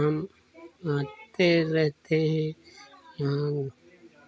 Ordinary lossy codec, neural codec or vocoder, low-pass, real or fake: none; none; none; real